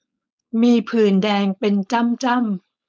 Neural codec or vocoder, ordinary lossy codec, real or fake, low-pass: codec, 16 kHz, 4.8 kbps, FACodec; none; fake; none